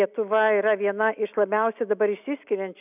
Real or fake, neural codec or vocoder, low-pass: real; none; 3.6 kHz